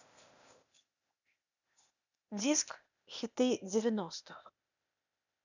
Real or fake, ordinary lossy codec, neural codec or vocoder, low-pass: fake; none; codec, 16 kHz, 0.8 kbps, ZipCodec; 7.2 kHz